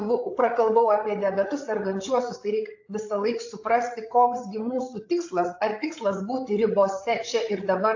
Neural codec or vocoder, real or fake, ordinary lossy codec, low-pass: codec, 16 kHz, 8 kbps, FreqCodec, larger model; fake; AAC, 48 kbps; 7.2 kHz